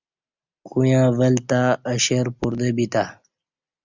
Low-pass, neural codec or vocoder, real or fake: 7.2 kHz; none; real